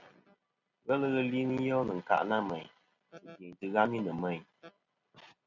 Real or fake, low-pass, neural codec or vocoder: real; 7.2 kHz; none